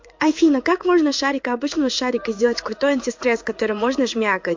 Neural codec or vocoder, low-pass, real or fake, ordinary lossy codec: codec, 16 kHz in and 24 kHz out, 1 kbps, XY-Tokenizer; 7.2 kHz; fake; MP3, 48 kbps